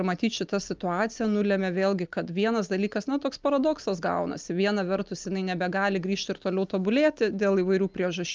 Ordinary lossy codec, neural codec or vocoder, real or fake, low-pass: Opus, 24 kbps; none; real; 7.2 kHz